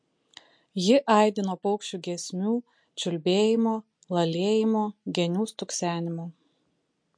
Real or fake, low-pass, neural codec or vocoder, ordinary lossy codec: real; 9.9 kHz; none; MP3, 48 kbps